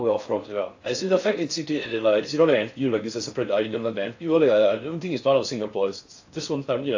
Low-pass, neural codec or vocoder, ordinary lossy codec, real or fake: 7.2 kHz; codec, 16 kHz in and 24 kHz out, 0.6 kbps, FocalCodec, streaming, 4096 codes; AAC, 48 kbps; fake